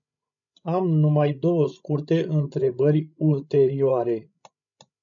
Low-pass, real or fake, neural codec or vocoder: 7.2 kHz; fake; codec, 16 kHz, 16 kbps, FreqCodec, larger model